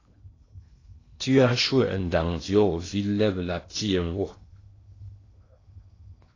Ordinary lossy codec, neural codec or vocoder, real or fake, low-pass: AAC, 32 kbps; codec, 16 kHz in and 24 kHz out, 0.8 kbps, FocalCodec, streaming, 65536 codes; fake; 7.2 kHz